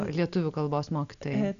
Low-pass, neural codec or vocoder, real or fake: 7.2 kHz; none; real